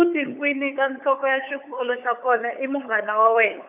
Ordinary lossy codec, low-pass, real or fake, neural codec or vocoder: none; 3.6 kHz; fake; codec, 16 kHz, 8 kbps, FunCodec, trained on LibriTTS, 25 frames a second